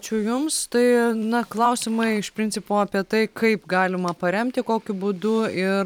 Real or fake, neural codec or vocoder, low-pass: fake; vocoder, 44.1 kHz, 128 mel bands every 512 samples, BigVGAN v2; 19.8 kHz